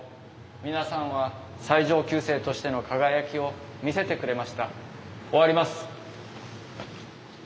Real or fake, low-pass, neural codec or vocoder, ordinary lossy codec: real; none; none; none